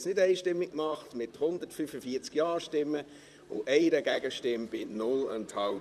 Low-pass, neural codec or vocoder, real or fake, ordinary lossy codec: 14.4 kHz; vocoder, 44.1 kHz, 128 mel bands, Pupu-Vocoder; fake; none